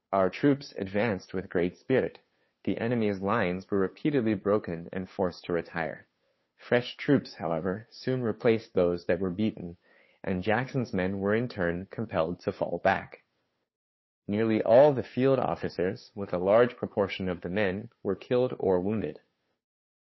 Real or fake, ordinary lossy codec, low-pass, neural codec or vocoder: fake; MP3, 24 kbps; 7.2 kHz; codec, 16 kHz, 2 kbps, FunCodec, trained on Chinese and English, 25 frames a second